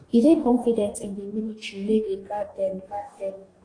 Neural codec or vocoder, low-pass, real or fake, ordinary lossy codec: codec, 44.1 kHz, 2.6 kbps, DAC; 9.9 kHz; fake; AAC, 32 kbps